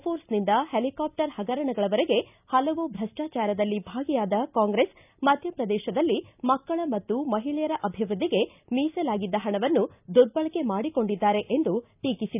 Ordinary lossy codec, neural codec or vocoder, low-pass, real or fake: none; none; 3.6 kHz; real